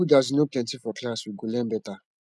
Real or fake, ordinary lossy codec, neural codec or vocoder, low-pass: real; none; none; none